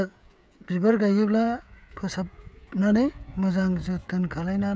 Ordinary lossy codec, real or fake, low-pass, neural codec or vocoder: none; fake; none; codec, 16 kHz, 16 kbps, FreqCodec, smaller model